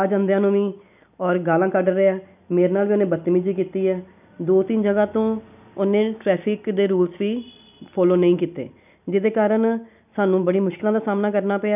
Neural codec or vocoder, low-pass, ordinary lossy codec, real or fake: none; 3.6 kHz; none; real